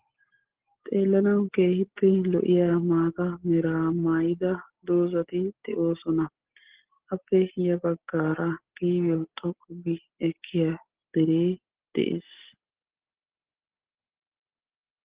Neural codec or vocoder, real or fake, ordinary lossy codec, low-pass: none; real; Opus, 16 kbps; 3.6 kHz